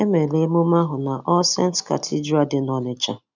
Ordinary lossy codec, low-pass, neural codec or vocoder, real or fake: none; 7.2 kHz; none; real